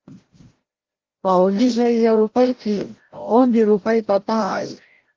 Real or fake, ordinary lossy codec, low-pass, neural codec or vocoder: fake; Opus, 16 kbps; 7.2 kHz; codec, 16 kHz, 0.5 kbps, FreqCodec, larger model